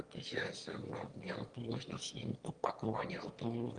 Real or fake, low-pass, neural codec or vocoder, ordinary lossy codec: fake; 9.9 kHz; autoencoder, 22.05 kHz, a latent of 192 numbers a frame, VITS, trained on one speaker; Opus, 24 kbps